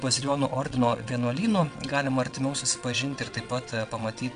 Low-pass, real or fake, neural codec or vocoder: 9.9 kHz; fake; vocoder, 22.05 kHz, 80 mel bands, WaveNeXt